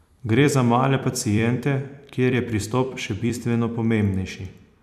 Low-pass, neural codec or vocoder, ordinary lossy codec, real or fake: 14.4 kHz; vocoder, 44.1 kHz, 128 mel bands every 256 samples, BigVGAN v2; none; fake